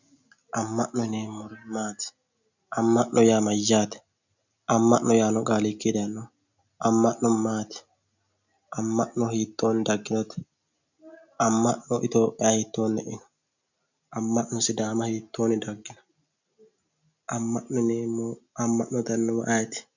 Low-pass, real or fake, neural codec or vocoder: 7.2 kHz; real; none